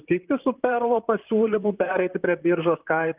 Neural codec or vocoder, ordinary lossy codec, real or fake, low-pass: vocoder, 44.1 kHz, 128 mel bands, Pupu-Vocoder; Opus, 32 kbps; fake; 3.6 kHz